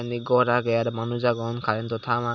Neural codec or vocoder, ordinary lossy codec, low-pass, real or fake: none; none; 7.2 kHz; real